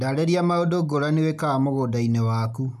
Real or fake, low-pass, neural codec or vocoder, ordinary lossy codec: real; 14.4 kHz; none; none